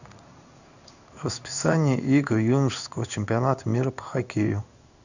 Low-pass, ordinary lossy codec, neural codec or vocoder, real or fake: 7.2 kHz; none; codec, 16 kHz in and 24 kHz out, 1 kbps, XY-Tokenizer; fake